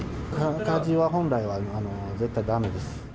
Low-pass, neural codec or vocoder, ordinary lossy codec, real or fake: none; none; none; real